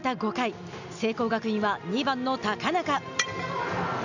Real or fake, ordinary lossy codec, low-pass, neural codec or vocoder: real; none; 7.2 kHz; none